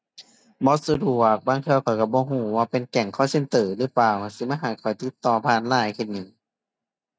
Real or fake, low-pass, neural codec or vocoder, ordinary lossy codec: real; none; none; none